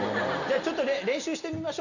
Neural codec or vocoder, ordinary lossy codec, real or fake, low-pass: none; none; real; 7.2 kHz